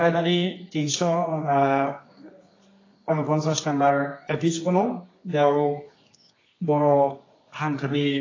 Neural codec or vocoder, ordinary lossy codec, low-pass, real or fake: codec, 24 kHz, 0.9 kbps, WavTokenizer, medium music audio release; AAC, 32 kbps; 7.2 kHz; fake